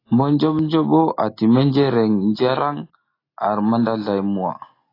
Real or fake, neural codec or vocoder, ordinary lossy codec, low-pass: real; none; AAC, 24 kbps; 5.4 kHz